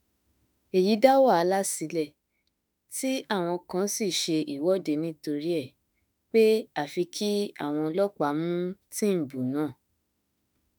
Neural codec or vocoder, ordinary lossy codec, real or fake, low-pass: autoencoder, 48 kHz, 32 numbers a frame, DAC-VAE, trained on Japanese speech; none; fake; none